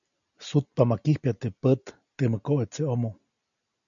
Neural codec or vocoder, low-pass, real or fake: none; 7.2 kHz; real